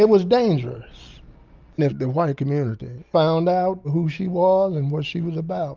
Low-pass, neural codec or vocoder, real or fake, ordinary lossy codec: 7.2 kHz; none; real; Opus, 24 kbps